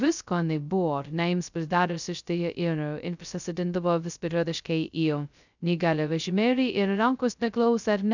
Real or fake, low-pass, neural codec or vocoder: fake; 7.2 kHz; codec, 16 kHz, 0.2 kbps, FocalCodec